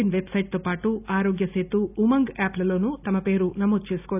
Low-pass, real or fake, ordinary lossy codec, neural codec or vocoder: 3.6 kHz; real; none; none